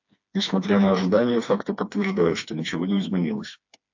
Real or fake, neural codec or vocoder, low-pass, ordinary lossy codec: fake; codec, 16 kHz, 2 kbps, FreqCodec, smaller model; 7.2 kHz; AAC, 48 kbps